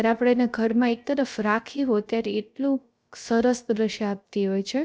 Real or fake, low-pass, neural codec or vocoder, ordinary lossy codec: fake; none; codec, 16 kHz, 0.3 kbps, FocalCodec; none